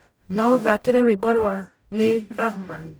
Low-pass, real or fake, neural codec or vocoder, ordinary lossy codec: none; fake; codec, 44.1 kHz, 0.9 kbps, DAC; none